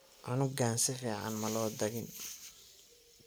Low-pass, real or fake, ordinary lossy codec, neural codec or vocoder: none; real; none; none